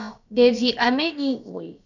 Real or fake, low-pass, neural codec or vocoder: fake; 7.2 kHz; codec, 16 kHz, about 1 kbps, DyCAST, with the encoder's durations